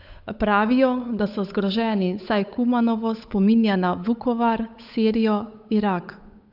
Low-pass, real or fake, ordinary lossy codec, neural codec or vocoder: 5.4 kHz; fake; none; codec, 16 kHz, 8 kbps, FunCodec, trained on Chinese and English, 25 frames a second